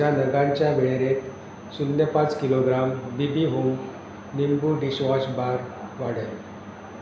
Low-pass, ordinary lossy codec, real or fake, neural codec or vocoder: none; none; real; none